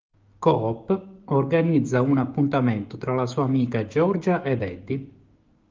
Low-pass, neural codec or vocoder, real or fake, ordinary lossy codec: 7.2 kHz; codec, 16 kHz, 6 kbps, DAC; fake; Opus, 32 kbps